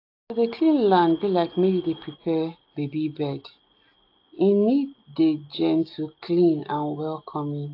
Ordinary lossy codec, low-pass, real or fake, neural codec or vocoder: AAC, 32 kbps; 5.4 kHz; real; none